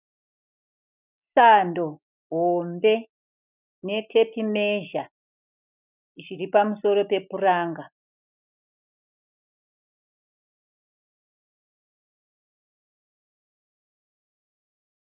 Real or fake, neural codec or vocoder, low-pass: real; none; 3.6 kHz